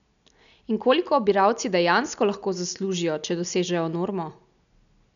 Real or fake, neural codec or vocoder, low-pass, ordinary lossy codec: real; none; 7.2 kHz; none